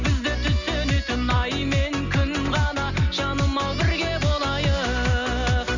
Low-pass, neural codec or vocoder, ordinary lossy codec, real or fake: 7.2 kHz; none; none; real